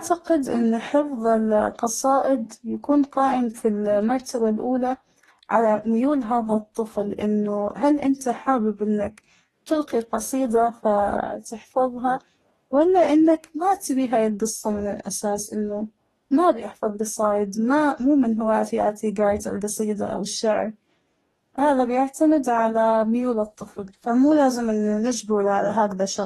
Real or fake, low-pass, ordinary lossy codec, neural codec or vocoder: fake; 19.8 kHz; AAC, 32 kbps; codec, 44.1 kHz, 2.6 kbps, DAC